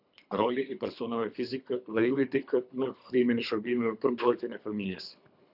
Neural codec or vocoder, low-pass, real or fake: codec, 24 kHz, 3 kbps, HILCodec; 5.4 kHz; fake